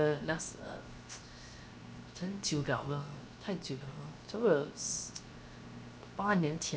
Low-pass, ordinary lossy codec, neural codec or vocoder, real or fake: none; none; codec, 16 kHz, 0.7 kbps, FocalCodec; fake